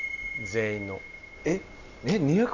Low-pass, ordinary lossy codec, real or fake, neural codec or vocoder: 7.2 kHz; none; real; none